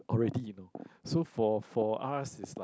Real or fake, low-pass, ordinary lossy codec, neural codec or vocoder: real; none; none; none